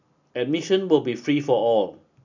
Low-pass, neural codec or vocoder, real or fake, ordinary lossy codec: 7.2 kHz; none; real; none